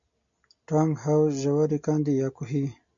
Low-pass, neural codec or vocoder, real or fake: 7.2 kHz; none; real